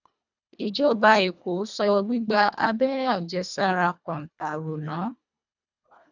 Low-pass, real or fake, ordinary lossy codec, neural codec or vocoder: 7.2 kHz; fake; none; codec, 24 kHz, 1.5 kbps, HILCodec